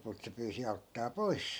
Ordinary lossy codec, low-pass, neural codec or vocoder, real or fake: none; none; none; real